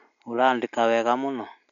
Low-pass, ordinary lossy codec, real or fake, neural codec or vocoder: 7.2 kHz; none; real; none